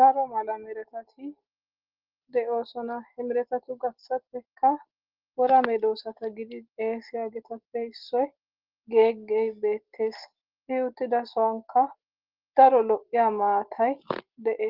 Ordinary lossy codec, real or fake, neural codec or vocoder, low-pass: Opus, 16 kbps; real; none; 5.4 kHz